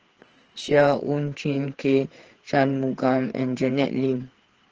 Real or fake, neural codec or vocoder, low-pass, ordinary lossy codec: fake; codec, 16 kHz, 4 kbps, FreqCodec, larger model; 7.2 kHz; Opus, 16 kbps